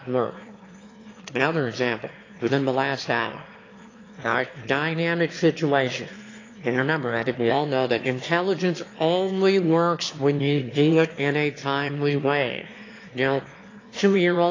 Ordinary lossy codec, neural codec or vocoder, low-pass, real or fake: AAC, 32 kbps; autoencoder, 22.05 kHz, a latent of 192 numbers a frame, VITS, trained on one speaker; 7.2 kHz; fake